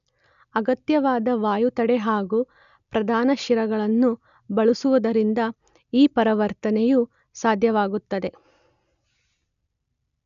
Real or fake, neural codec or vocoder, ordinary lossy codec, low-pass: real; none; none; 7.2 kHz